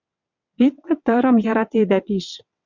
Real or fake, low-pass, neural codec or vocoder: fake; 7.2 kHz; vocoder, 22.05 kHz, 80 mel bands, WaveNeXt